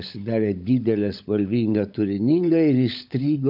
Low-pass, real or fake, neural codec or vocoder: 5.4 kHz; fake; codec, 16 kHz, 16 kbps, FunCodec, trained on LibriTTS, 50 frames a second